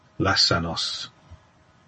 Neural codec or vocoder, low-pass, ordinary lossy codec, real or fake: none; 10.8 kHz; MP3, 32 kbps; real